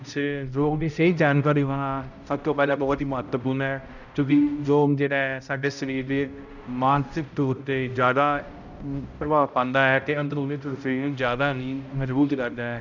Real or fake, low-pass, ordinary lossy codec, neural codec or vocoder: fake; 7.2 kHz; none; codec, 16 kHz, 0.5 kbps, X-Codec, HuBERT features, trained on balanced general audio